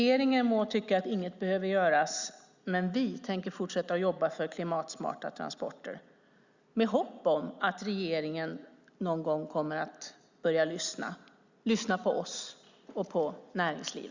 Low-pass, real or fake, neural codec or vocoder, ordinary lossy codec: 7.2 kHz; real; none; Opus, 64 kbps